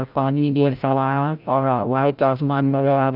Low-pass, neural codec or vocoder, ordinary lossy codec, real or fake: 5.4 kHz; codec, 16 kHz, 0.5 kbps, FreqCodec, larger model; none; fake